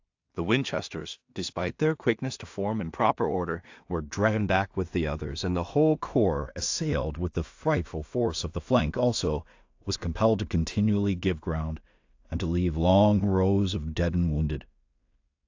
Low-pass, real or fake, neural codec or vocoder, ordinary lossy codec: 7.2 kHz; fake; codec, 16 kHz in and 24 kHz out, 0.4 kbps, LongCat-Audio-Codec, two codebook decoder; AAC, 48 kbps